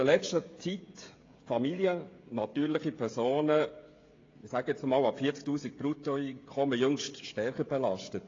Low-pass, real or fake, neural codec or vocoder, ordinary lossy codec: 7.2 kHz; fake; codec, 16 kHz, 16 kbps, FreqCodec, smaller model; AAC, 32 kbps